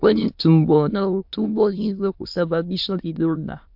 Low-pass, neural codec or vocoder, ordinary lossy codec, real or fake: 5.4 kHz; autoencoder, 22.05 kHz, a latent of 192 numbers a frame, VITS, trained on many speakers; MP3, 48 kbps; fake